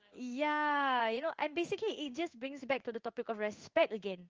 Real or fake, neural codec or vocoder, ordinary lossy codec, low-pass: fake; codec, 16 kHz in and 24 kHz out, 1 kbps, XY-Tokenizer; Opus, 24 kbps; 7.2 kHz